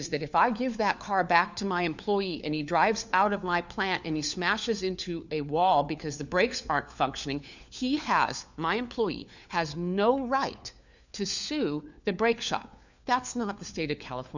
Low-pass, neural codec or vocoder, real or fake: 7.2 kHz; codec, 16 kHz, 4 kbps, FunCodec, trained on LibriTTS, 50 frames a second; fake